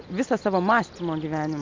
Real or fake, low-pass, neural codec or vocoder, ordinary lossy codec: real; 7.2 kHz; none; Opus, 24 kbps